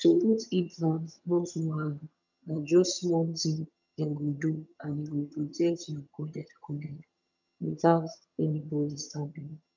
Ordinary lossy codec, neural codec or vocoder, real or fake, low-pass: none; vocoder, 22.05 kHz, 80 mel bands, HiFi-GAN; fake; 7.2 kHz